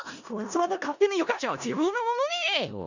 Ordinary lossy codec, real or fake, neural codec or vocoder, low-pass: none; fake; codec, 16 kHz in and 24 kHz out, 0.4 kbps, LongCat-Audio-Codec, four codebook decoder; 7.2 kHz